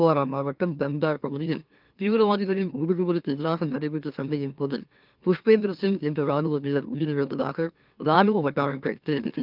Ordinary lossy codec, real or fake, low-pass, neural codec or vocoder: Opus, 32 kbps; fake; 5.4 kHz; autoencoder, 44.1 kHz, a latent of 192 numbers a frame, MeloTTS